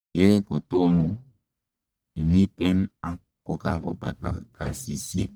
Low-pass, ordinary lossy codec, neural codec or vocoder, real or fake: none; none; codec, 44.1 kHz, 1.7 kbps, Pupu-Codec; fake